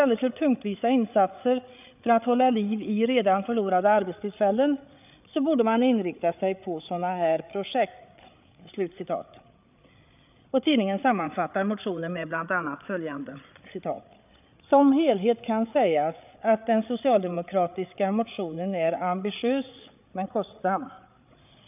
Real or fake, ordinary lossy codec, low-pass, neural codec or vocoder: fake; none; 3.6 kHz; codec, 16 kHz, 8 kbps, FreqCodec, larger model